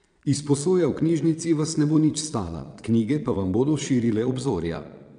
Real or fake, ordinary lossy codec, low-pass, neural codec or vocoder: fake; none; 9.9 kHz; vocoder, 22.05 kHz, 80 mel bands, Vocos